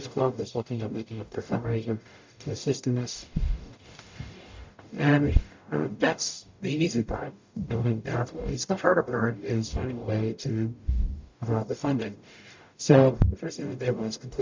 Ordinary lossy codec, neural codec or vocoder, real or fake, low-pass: MP3, 64 kbps; codec, 44.1 kHz, 0.9 kbps, DAC; fake; 7.2 kHz